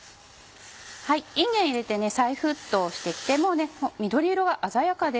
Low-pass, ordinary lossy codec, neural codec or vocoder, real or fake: none; none; none; real